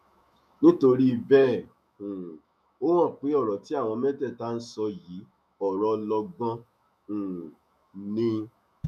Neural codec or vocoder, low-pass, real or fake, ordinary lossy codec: autoencoder, 48 kHz, 128 numbers a frame, DAC-VAE, trained on Japanese speech; 14.4 kHz; fake; none